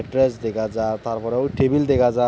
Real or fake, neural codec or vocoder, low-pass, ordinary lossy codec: real; none; none; none